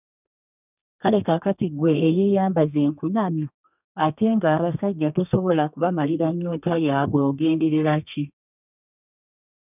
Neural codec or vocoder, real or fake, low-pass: codec, 32 kHz, 1.9 kbps, SNAC; fake; 3.6 kHz